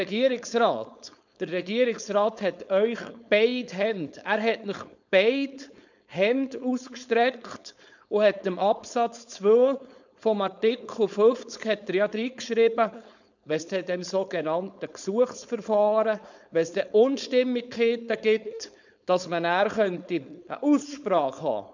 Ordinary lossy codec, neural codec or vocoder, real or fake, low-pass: none; codec, 16 kHz, 4.8 kbps, FACodec; fake; 7.2 kHz